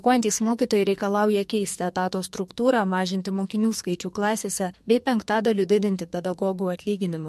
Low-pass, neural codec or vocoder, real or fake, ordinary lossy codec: 14.4 kHz; codec, 44.1 kHz, 2.6 kbps, SNAC; fake; MP3, 64 kbps